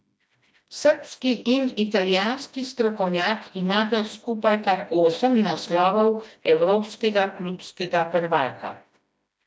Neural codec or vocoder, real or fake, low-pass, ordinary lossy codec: codec, 16 kHz, 1 kbps, FreqCodec, smaller model; fake; none; none